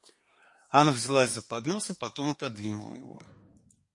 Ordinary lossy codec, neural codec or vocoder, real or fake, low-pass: MP3, 48 kbps; codec, 24 kHz, 1 kbps, SNAC; fake; 10.8 kHz